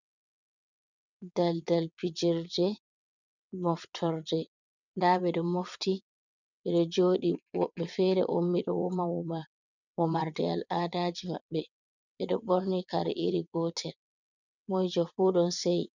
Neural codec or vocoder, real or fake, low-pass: vocoder, 22.05 kHz, 80 mel bands, WaveNeXt; fake; 7.2 kHz